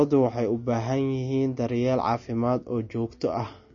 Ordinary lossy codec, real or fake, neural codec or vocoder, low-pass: MP3, 32 kbps; real; none; 7.2 kHz